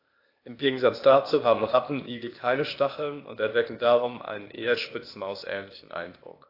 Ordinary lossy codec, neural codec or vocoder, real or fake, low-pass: AAC, 32 kbps; codec, 16 kHz, 0.8 kbps, ZipCodec; fake; 5.4 kHz